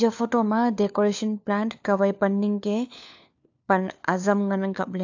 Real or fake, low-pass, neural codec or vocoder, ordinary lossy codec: fake; 7.2 kHz; codec, 16 kHz, 4 kbps, FunCodec, trained on LibriTTS, 50 frames a second; AAC, 48 kbps